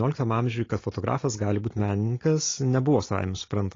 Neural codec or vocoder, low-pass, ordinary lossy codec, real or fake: none; 7.2 kHz; AAC, 32 kbps; real